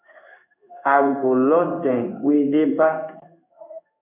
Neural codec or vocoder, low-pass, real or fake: codec, 16 kHz in and 24 kHz out, 1 kbps, XY-Tokenizer; 3.6 kHz; fake